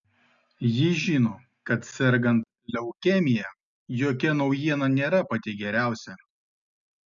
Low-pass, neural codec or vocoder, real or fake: 7.2 kHz; none; real